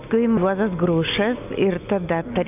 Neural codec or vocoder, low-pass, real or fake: none; 3.6 kHz; real